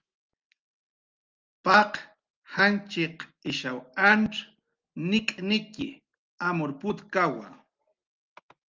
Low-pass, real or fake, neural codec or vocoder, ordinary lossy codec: 7.2 kHz; real; none; Opus, 32 kbps